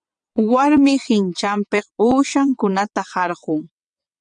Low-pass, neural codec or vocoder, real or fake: 9.9 kHz; vocoder, 22.05 kHz, 80 mel bands, WaveNeXt; fake